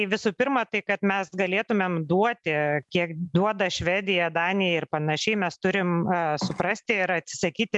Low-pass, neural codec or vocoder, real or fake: 10.8 kHz; none; real